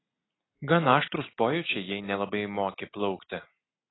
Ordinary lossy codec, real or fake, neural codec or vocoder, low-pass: AAC, 16 kbps; real; none; 7.2 kHz